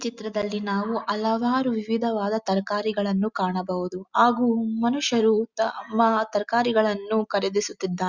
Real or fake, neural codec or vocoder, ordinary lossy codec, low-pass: real; none; Opus, 64 kbps; 7.2 kHz